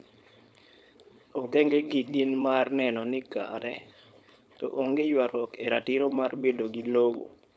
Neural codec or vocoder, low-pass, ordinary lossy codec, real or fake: codec, 16 kHz, 4.8 kbps, FACodec; none; none; fake